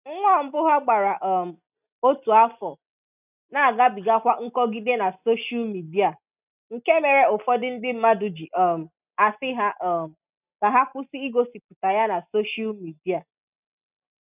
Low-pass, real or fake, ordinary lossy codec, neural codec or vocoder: 3.6 kHz; real; none; none